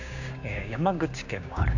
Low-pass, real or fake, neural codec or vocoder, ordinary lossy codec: 7.2 kHz; fake; codec, 16 kHz, 6 kbps, DAC; none